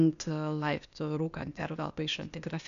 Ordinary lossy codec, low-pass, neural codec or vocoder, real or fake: MP3, 96 kbps; 7.2 kHz; codec, 16 kHz, 0.8 kbps, ZipCodec; fake